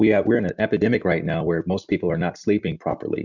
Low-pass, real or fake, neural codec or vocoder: 7.2 kHz; real; none